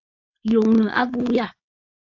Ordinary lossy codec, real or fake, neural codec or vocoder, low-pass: AAC, 48 kbps; fake; codec, 16 kHz, 4.8 kbps, FACodec; 7.2 kHz